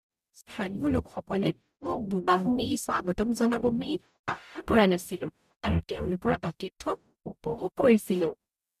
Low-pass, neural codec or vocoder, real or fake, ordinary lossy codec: 14.4 kHz; codec, 44.1 kHz, 0.9 kbps, DAC; fake; none